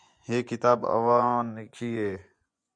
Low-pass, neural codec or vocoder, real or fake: 9.9 kHz; vocoder, 24 kHz, 100 mel bands, Vocos; fake